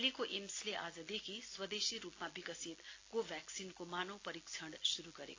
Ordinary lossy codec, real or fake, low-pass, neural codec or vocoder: AAC, 32 kbps; real; 7.2 kHz; none